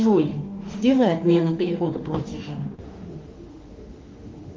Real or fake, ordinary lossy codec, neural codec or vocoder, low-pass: fake; Opus, 24 kbps; autoencoder, 48 kHz, 32 numbers a frame, DAC-VAE, trained on Japanese speech; 7.2 kHz